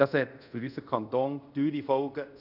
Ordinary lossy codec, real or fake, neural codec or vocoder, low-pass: none; fake; codec, 24 kHz, 0.5 kbps, DualCodec; 5.4 kHz